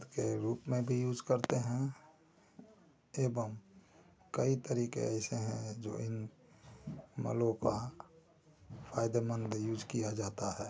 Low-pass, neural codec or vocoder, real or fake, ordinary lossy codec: none; none; real; none